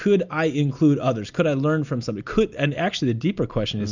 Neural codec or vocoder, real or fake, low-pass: none; real; 7.2 kHz